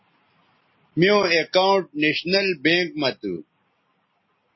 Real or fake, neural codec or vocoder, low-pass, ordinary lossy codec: real; none; 7.2 kHz; MP3, 24 kbps